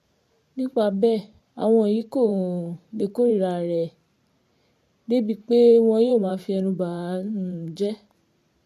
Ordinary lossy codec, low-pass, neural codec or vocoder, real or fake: MP3, 64 kbps; 14.4 kHz; vocoder, 44.1 kHz, 128 mel bands every 512 samples, BigVGAN v2; fake